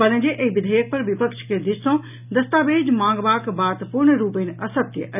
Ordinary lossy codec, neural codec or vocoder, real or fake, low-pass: none; none; real; 3.6 kHz